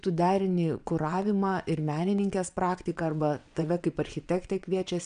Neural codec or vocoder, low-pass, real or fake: vocoder, 22.05 kHz, 80 mel bands, WaveNeXt; 9.9 kHz; fake